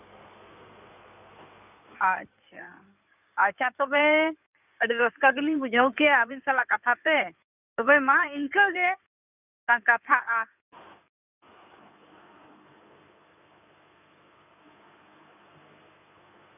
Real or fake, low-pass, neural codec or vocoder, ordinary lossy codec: fake; 3.6 kHz; codec, 16 kHz in and 24 kHz out, 2.2 kbps, FireRedTTS-2 codec; none